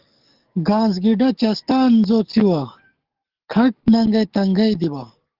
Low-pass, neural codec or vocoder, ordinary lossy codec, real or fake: 5.4 kHz; codec, 44.1 kHz, 7.8 kbps, DAC; Opus, 16 kbps; fake